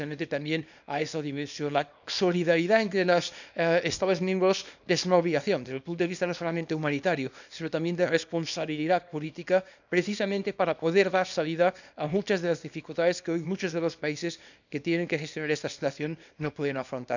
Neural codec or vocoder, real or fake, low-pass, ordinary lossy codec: codec, 24 kHz, 0.9 kbps, WavTokenizer, small release; fake; 7.2 kHz; none